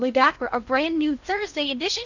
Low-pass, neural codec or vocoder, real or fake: 7.2 kHz; codec, 16 kHz in and 24 kHz out, 0.6 kbps, FocalCodec, streaming, 2048 codes; fake